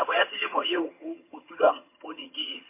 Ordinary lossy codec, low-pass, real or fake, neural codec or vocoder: MP3, 24 kbps; 3.6 kHz; fake; vocoder, 22.05 kHz, 80 mel bands, HiFi-GAN